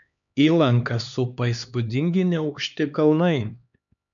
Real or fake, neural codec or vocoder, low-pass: fake; codec, 16 kHz, 2 kbps, X-Codec, HuBERT features, trained on LibriSpeech; 7.2 kHz